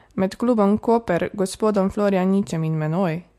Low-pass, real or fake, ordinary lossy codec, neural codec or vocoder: 14.4 kHz; real; MP3, 64 kbps; none